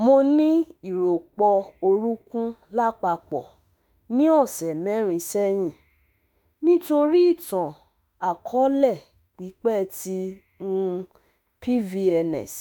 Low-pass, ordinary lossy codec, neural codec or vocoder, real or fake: none; none; autoencoder, 48 kHz, 32 numbers a frame, DAC-VAE, trained on Japanese speech; fake